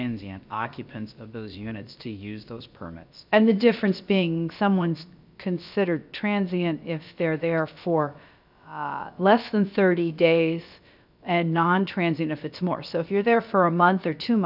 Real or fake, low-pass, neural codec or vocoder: fake; 5.4 kHz; codec, 16 kHz, about 1 kbps, DyCAST, with the encoder's durations